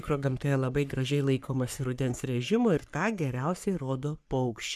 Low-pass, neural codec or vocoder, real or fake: 14.4 kHz; codec, 44.1 kHz, 3.4 kbps, Pupu-Codec; fake